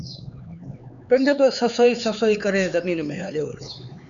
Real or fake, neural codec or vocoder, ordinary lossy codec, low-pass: fake; codec, 16 kHz, 4 kbps, X-Codec, HuBERT features, trained on LibriSpeech; MP3, 96 kbps; 7.2 kHz